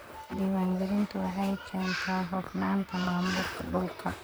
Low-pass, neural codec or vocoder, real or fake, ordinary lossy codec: none; vocoder, 44.1 kHz, 128 mel bands, Pupu-Vocoder; fake; none